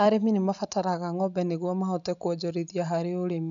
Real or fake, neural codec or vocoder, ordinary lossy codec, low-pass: real; none; MP3, 96 kbps; 7.2 kHz